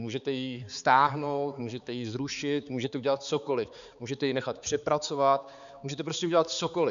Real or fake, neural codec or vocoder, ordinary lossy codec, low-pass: fake; codec, 16 kHz, 4 kbps, X-Codec, HuBERT features, trained on balanced general audio; MP3, 96 kbps; 7.2 kHz